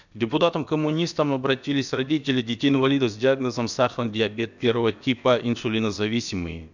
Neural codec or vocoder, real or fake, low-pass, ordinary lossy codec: codec, 16 kHz, about 1 kbps, DyCAST, with the encoder's durations; fake; 7.2 kHz; none